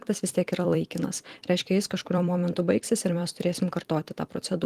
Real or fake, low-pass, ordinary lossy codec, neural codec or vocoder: fake; 14.4 kHz; Opus, 24 kbps; vocoder, 44.1 kHz, 128 mel bands every 256 samples, BigVGAN v2